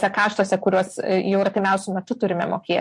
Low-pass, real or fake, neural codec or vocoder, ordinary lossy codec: 10.8 kHz; real; none; MP3, 48 kbps